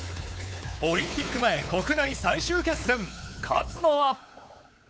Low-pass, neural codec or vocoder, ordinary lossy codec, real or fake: none; codec, 16 kHz, 4 kbps, X-Codec, WavLM features, trained on Multilingual LibriSpeech; none; fake